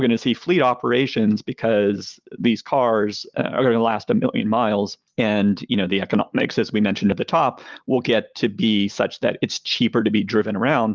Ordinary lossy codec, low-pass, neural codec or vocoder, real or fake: Opus, 24 kbps; 7.2 kHz; none; real